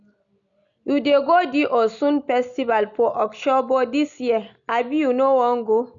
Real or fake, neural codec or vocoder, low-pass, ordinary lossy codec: real; none; 7.2 kHz; none